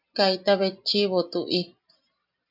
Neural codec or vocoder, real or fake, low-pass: none; real; 5.4 kHz